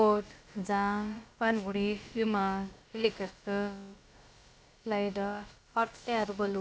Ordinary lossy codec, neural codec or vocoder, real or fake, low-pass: none; codec, 16 kHz, about 1 kbps, DyCAST, with the encoder's durations; fake; none